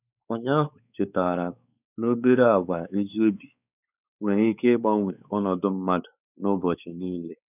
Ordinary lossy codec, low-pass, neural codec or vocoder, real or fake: none; 3.6 kHz; codec, 16 kHz, 4 kbps, X-Codec, WavLM features, trained on Multilingual LibriSpeech; fake